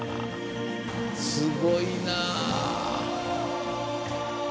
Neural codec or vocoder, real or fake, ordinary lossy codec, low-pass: none; real; none; none